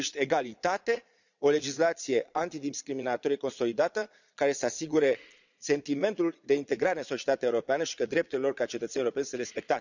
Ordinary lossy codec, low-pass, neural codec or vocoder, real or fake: none; 7.2 kHz; vocoder, 22.05 kHz, 80 mel bands, Vocos; fake